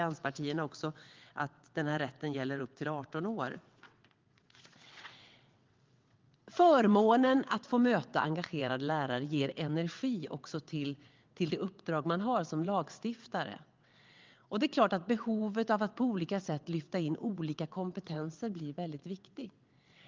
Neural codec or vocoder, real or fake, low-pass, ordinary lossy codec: vocoder, 22.05 kHz, 80 mel bands, WaveNeXt; fake; 7.2 kHz; Opus, 32 kbps